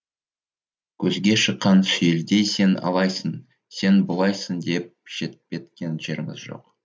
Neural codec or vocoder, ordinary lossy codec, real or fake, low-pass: none; none; real; none